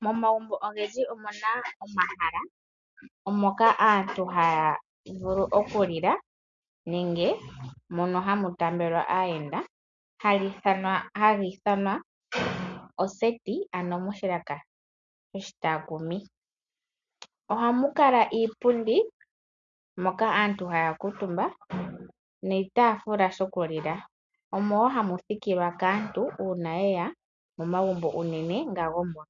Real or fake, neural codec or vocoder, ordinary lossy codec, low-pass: real; none; MP3, 64 kbps; 7.2 kHz